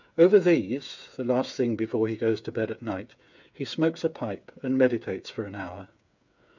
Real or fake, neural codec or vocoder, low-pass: fake; codec, 16 kHz, 8 kbps, FreqCodec, smaller model; 7.2 kHz